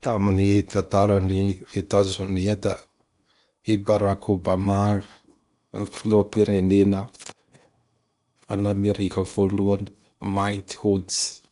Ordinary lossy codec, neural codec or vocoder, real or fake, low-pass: none; codec, 16 kHz in and 24 kHz out, 0.8 kbps, FocalCodec, streaming, 65536 codes; fake; 10.8 kHz